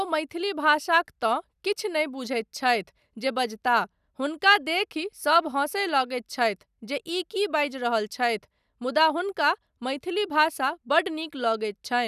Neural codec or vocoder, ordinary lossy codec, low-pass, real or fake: none; none; 14.4 kHz; real